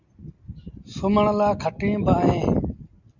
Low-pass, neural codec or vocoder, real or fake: 7.2 kHz; none; real